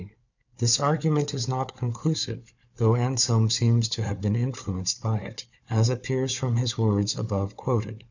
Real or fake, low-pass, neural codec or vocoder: fake; 7.2 kHz; codec, 16 kHz, 4 kbps, FunCodec, trained on Chinese and English, 50 frames a second